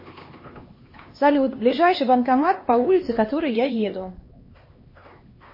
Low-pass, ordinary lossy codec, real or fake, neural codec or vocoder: 5.4 kHz; MP3, 24 kbps; fake; codec, 16 kHz, 2 kbps, X-Codec, HuBERT features, trained on LibriSpeech